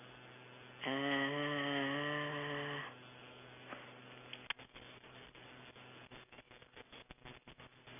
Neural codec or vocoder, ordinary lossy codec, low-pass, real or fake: none; none; 3.6 kHz; real